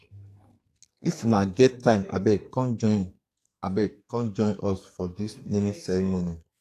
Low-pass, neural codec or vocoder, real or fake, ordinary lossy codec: 14.4 kHz; codec, 44.1 kHz, 2.6 kbps, SNAC; fake; AAC, 64 kbps